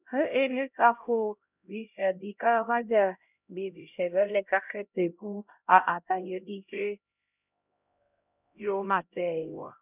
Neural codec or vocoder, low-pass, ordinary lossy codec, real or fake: codec, 16 kHz, 0.5 kbps, X-Codec, HuBERT features, trained on LibriSpeech; 3.6 kHz; none; fake